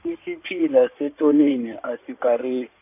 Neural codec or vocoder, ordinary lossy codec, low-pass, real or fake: codec, 16 kHz in and 24 kHz out, 2.2 kbps, FireRedTTS-2 codec; none; 3.6 kHz; fake